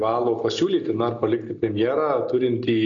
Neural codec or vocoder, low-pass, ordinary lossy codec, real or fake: none; 7.2 kHz; MP3, 96 kbps; real